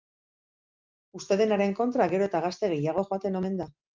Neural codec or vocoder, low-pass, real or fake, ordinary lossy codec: none; 7.2 kHz; real; Opus, 32 kbps